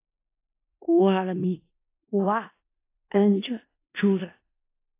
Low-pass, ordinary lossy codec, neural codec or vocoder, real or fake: 3.6 kHz; AAC, 24 kbps; codec, 16 kHz in and 24 kHz out, 0.4 kbps, LongCat-Audio-Codec, four codebook decoder; fake